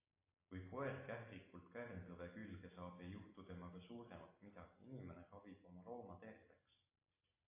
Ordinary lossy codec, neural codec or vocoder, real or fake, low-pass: AAC, 24 kbps; none; real; 3.6 kHz